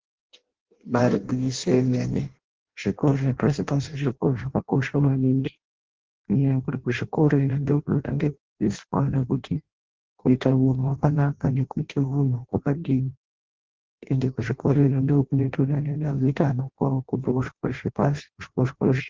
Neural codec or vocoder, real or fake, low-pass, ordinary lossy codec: codec, 16 kHz in and 24 kHz out, 0.6 kbps, FireRedTTS-2 codec; fake; 7.2 kHz; Opus, 16 kbps